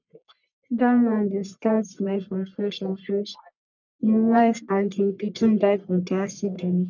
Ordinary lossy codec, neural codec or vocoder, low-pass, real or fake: none; codec, 44.1 kHz, 1.7 kbps, Pupu-Codec; 7.2 kHz; fake